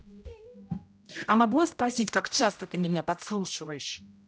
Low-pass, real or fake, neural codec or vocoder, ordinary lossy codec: none; fake; codec, 16 kHz, 0.5 kbps, X-Codec, HuBERT features, trained on general audio; none